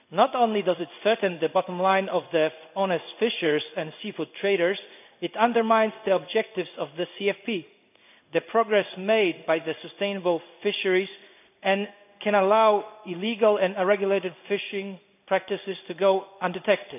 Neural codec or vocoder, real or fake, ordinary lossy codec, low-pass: codec, 16 kHz in and 24 kHz out, 1 kbps, XY-Tokenizer; fake; none; 3.6 kHz